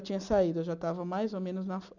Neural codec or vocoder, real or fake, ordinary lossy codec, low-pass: none; real; none; 7.2 kHz